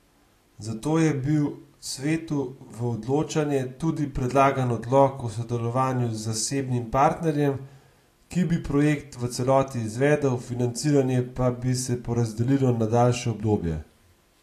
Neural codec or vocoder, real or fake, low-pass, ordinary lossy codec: none; real; 14.4 kHz; AAC, 48 kbps